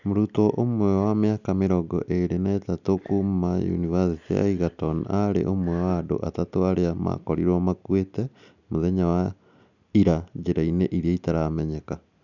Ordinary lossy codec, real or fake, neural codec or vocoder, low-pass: none; real; none; 7.2 kHz